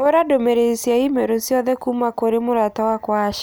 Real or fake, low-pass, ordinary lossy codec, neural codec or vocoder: real; none; none; none